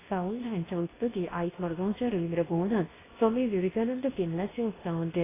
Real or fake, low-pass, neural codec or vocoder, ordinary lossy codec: fake; 3.6 kHz; codec, 24 kHz, 0.9 kbps, WavTokenizer, medium speech release version 2; AAC, 16 kbps